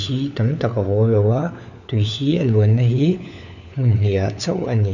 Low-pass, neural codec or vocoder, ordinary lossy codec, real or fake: 7.2 kHz; codec, 16 kHz, 4 kbps, FunCodec, trained on LibriTTS, 50 frames a second; none; fake